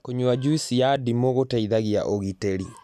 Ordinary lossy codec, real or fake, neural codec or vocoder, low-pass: none; real; none; 14.4 kHz